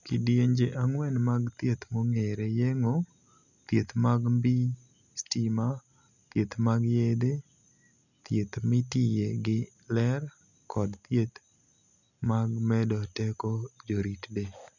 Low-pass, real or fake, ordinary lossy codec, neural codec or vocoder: 7.2 kHz; real; none; none